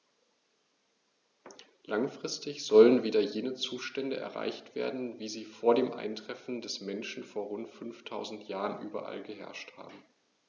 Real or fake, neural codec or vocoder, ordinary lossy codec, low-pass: real; none; none; 7.2 kHz